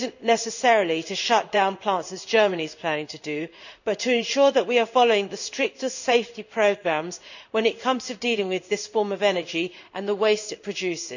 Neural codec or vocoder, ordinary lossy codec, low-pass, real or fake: codec, 16 kHz in and 24 kHz out, 1 kbps, XY-Tokenizer; none; 7.2 kHz; fake